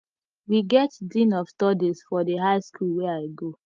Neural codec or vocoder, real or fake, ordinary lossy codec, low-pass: none; real; Opus, 24 kbps; 7.2 kHz